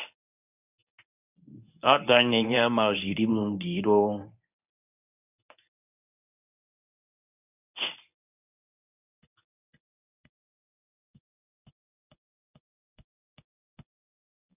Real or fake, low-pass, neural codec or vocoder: fake; 3.6 kHz; codec, 24 kHz, 0.9 kbps, WavTokenizer, medium speech release version 1